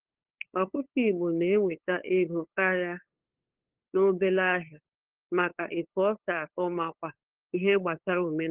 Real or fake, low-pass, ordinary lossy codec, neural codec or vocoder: fake; 3.6 kHz; Opus, 16 kbps; codec, 16 kHz, 8 kbps, FunCodec, trained on LibriTTS, 25 frames a second